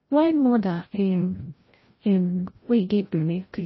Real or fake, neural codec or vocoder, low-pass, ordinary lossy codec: fake; codec, 16 kHz, 0.5 kbps, FreqCodec, larger model; 7.2 kHz; MP3, 24 kbps